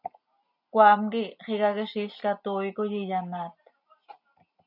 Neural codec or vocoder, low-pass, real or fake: none; 5.4 kHz; real